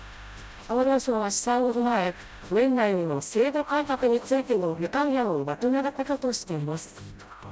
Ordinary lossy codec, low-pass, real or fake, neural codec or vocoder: none; none; fake; codec, 16 kHz, 0.5 kbps, FreqCodec, smaller model